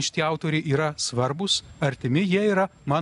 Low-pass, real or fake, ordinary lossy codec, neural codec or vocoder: 10.8 kHz; real; AAC, 96 kbps; none